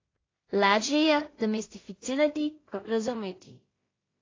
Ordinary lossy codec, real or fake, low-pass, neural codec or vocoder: AAC, 32 kbps; fake; 7.2 kHz; codec, 16 kHz in and 24 kHz out, 0.4 kbps, LongCat-Audio-Codec, two codebook decoder